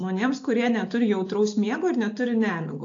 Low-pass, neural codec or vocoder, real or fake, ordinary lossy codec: 7.2 kHz; none; real; AAC, 48 kbps